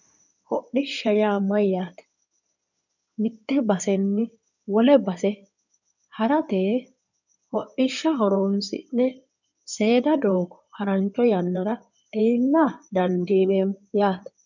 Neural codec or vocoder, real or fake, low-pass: codec, 16 kHz in and 24 kHz out, 2.2 kbps, FireRedTTS-2 codec; fake; 7.2 kHz